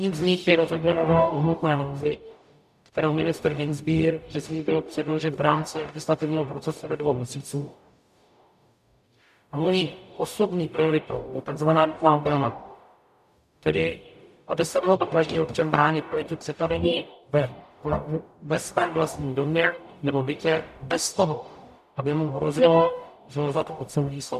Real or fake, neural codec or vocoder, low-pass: fake; codec, 44.1 kHz, 0.9 kbps, DAC; 14.4 kHz